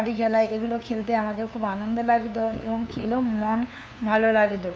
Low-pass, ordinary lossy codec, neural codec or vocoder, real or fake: none; none; codec, 16 kHz, 2 kbps, FunCodec, trained on LibriTTS, 25 frames a second; fake